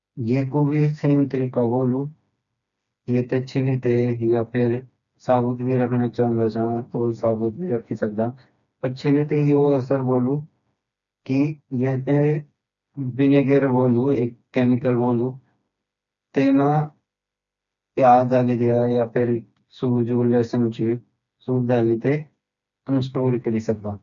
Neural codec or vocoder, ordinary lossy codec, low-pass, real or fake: codec, 16 kHz, 2 kbps, FreqCodec, smaller model; none; 7.2 kHz; fake